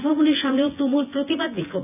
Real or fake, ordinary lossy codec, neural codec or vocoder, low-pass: fake; none; vocoder, 24 kHz, 100 mel bands, Vocos; 3.6 kHz